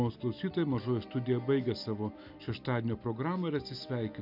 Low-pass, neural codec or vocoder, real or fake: 5.4 kHz; none; real